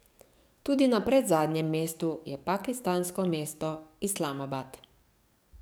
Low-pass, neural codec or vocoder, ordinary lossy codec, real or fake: none; codec, 44.1 kHz, 7.8 kbps, DAC; none; fake